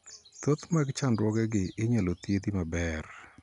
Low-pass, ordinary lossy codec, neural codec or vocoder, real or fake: 10.8 kHz; none; none; real